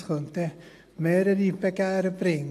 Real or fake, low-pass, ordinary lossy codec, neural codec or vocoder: real; 14.4 kHz; AAC, 64 kbps; none